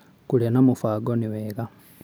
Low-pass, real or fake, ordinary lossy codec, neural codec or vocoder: none; real; none; none